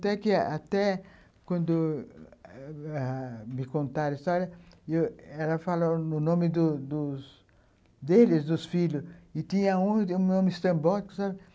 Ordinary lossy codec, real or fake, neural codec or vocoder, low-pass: none; real; none; none